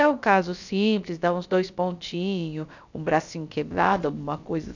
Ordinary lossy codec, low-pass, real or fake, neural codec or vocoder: none; 7.2 kHz; fake; codec, 16 kHz, about 1 kbps, DyCAST, with the encoder's durations